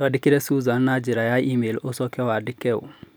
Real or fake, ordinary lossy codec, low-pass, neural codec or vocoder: real; none; none; none